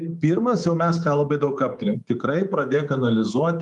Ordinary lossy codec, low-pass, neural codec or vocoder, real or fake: Opus, 32 kbps; 10.8 kHz; codec, 24 kHz, 3.1 kbps, DualCodec; fake